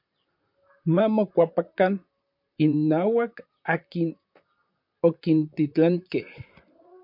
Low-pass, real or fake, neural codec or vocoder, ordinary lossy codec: 5.4 kHz; fake; vocoder, 44.1 kHz, 128 mel bands, Pupu-Vocoder; MP3, 48 kbps